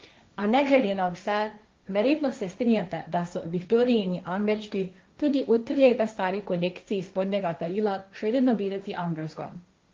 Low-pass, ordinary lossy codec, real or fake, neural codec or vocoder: 7.2 kHz; Opus, 32 kbps; fake; codec, 16 kHz, 1.1 kbps, Voila-Tokenizer